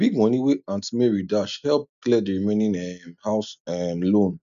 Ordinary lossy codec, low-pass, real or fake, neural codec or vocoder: none; 7.2 kHz; real; none